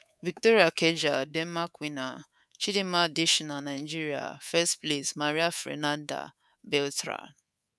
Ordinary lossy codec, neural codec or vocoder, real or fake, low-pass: none; codec, 24 kHz, 3.1 kbps, DualCodec; fake; none